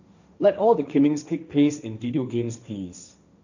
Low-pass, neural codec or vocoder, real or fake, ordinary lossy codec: 7.2 kHz; codec, 16 kHz, 1.1 kbps, Voila-Tokenizer; fake; none